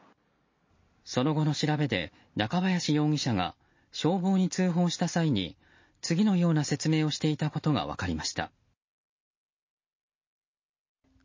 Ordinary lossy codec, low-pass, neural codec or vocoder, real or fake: MP3, 32 kbps; 7.2 kHz; none; real